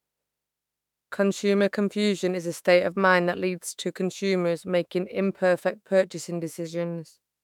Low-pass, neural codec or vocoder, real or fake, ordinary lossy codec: 19.8 kHz; autoencoder, 48 kHz, 32 numbers a frame, DAC-VAE, trained on Japanese speech; fake; none